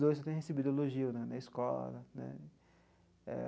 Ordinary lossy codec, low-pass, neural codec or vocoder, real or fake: none; none; none; real